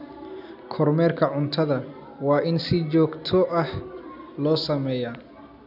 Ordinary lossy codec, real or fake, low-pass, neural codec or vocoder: none; real; 5.4 kHz; none